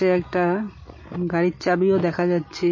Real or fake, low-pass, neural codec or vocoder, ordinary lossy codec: real; 7.2 kHz; none; MP3, 32 kbps